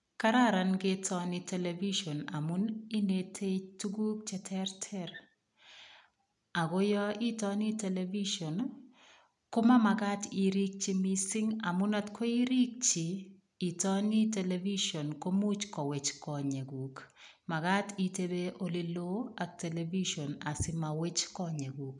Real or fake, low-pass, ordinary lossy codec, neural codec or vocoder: real; 10.8 kHz; none; none